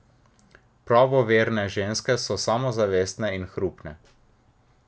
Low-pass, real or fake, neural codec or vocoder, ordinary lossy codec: none; real; none; none